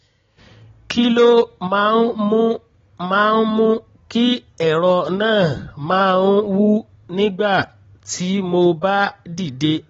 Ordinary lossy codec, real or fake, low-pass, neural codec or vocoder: AAC, 24 kbps; real; 7.2 kHz; none